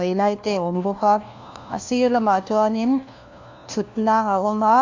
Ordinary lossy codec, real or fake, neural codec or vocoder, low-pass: AAC, 48 kbps; fake; codec, 16 kHz, 1 kbps, FunCodec, trained on LibriTTS, 50 frames a second; 7.2 kHz